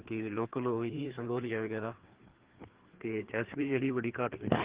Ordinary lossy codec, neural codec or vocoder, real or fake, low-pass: Opus, 16 kbps; codec, 16 kHz, 2 kbps, FreqCodec, larger model; fake; 3.6 kHz